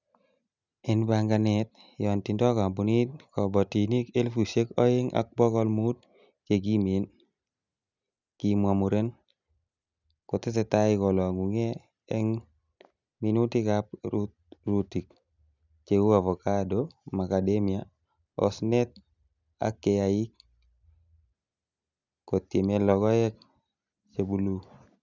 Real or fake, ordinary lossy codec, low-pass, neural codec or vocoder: real; none; 7.2 kHz; none